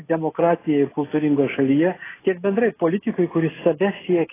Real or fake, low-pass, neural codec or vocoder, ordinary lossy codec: real; 3.6 kHz; none; AAC, 16 kbps